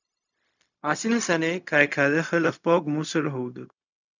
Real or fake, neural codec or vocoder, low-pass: fake; codec, 16 kHz, 0.4 kbps, LongCat-Audio-Codec; 7.2 kHz